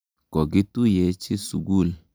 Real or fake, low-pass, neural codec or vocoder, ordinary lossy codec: real; none; none; none